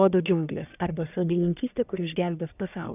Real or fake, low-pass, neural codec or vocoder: fake; 3.6 kHz; codec, 44.1 kHz, 1.7 kbps, Pupu-Codec